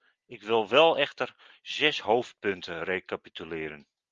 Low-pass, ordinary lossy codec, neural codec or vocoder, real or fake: 7.2 kHz; Opus, 32 kbps; none; real